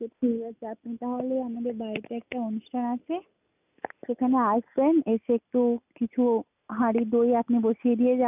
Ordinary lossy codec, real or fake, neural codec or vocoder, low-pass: none; real; none; 3.6 kHz